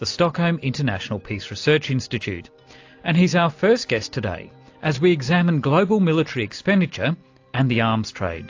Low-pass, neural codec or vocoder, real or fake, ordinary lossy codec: 7.2 kHz; none; real; AAC, 48 kbps